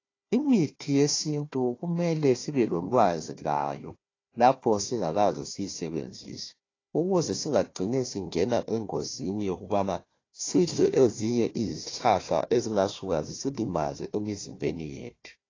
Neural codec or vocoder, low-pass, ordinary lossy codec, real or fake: codec, 16 kHz, 1 kbps, FunCodec, trained on Chinese and English, 50 frames a second; 7.2 kHz; AAC, 32 kbps; fake